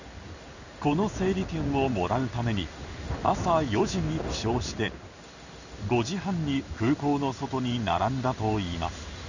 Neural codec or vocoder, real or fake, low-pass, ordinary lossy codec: codec, 16 kHz in and 24 kHz out, 1 kbps, XY-Tokenizer; fake; 7.2 kHz; none